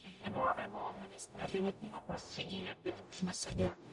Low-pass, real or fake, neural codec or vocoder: 10.8 kHz; fake; codec, 44.1 kHz, 0.9 kbps, DAC